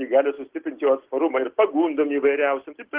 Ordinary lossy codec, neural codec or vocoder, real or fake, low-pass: Opus, 16 kbps; none; real; 3.6 kHz